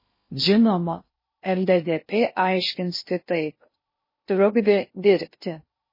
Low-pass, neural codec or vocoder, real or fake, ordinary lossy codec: 5.4 kHz; codec, 16 kHz in and 24 kHz out, 0.6 kbps, FocalCodec, streaming, 2048 codes; fake; MP3, 24 kbps